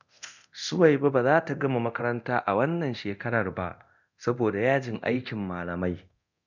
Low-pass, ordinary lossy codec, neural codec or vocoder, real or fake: 7.2 kHz; none; codec, 24 kHz, 0.9 kbps, DualCodec; fake